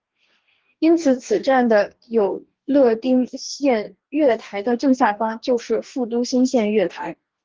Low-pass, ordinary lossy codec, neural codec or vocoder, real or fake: 7.2 kHz; Opus, 16 kbps; codec, 44.1 kHz, 2.6 kbps, DAC; fake